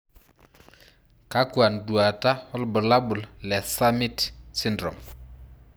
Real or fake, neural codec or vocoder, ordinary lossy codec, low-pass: real; none; none; none